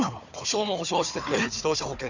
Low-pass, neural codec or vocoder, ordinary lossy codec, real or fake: 7.2 kHz; codec, 16 kHz, 4 kbps, FunCodec, trained on Chinese and English, 50 frames a second; none; fake